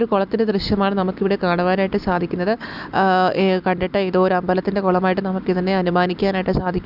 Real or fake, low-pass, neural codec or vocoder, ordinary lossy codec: real; 5.4 kHz; none; none